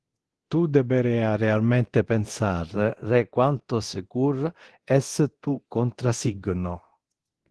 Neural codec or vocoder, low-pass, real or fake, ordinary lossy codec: codec, 24 kHz, 0.9 kbps, DualCodec; 10.8 kHz; fake; Opus, 16 kbps